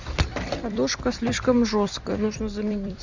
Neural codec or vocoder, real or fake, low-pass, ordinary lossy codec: vocoder, 44.1 kHz, 128 mel bands every 256 samples, BigVGAN v2; fake; 7.2 kHz; Opus, 64 kbps